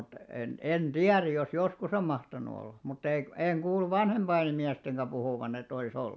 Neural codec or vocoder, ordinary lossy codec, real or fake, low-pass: none; none; real; none